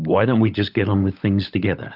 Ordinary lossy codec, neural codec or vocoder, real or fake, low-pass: Opus, 24 kbps; codec, 16 kHz, 16 kbps, FunCodec, trained on LibriTTS, 50 frames a second; fake; 5.4 kHz